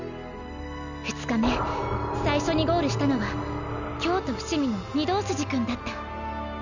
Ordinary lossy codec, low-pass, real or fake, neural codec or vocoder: none; 7.2 kHz; real; none